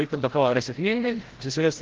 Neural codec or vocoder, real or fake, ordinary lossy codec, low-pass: codec, 16 kHz, 0.5 kbps, FreqCodec, larger model; fake; Opus, 16 kbps; 7.2 kHz